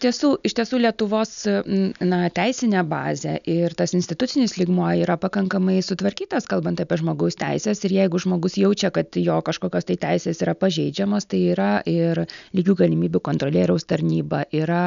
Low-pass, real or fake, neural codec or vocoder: 7.2 kHz; real; none